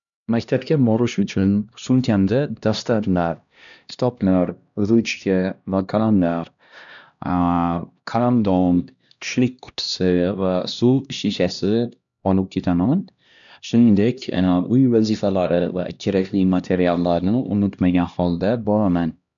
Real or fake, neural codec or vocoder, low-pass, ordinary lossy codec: fake; codec, 16 kHz, 1 kbps, X-Codec, HuBERT features, trained on LibriSpeech; 7.2 kHz; none